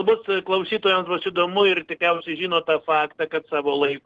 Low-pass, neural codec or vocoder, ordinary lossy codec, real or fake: 10.8 kHz; none; Opus, 16 kbps; real